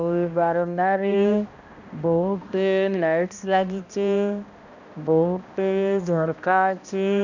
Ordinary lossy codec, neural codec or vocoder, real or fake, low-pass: none; codec, 16 kHz, 1 kbps, X-Codec, HuBERT features, trained on balanced general audio; fake; 7.2 kHz